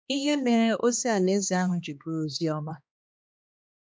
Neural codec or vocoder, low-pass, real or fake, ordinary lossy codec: codec, 16 kHz, 2 kbps, X-Codec, HuBERT features, trained on balanced general audio; none; fake; none